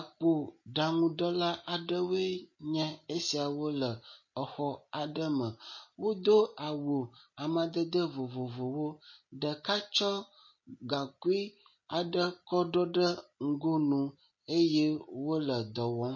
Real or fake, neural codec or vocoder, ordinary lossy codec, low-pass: real; none; MP3, 32 kbps; 7.2 kHz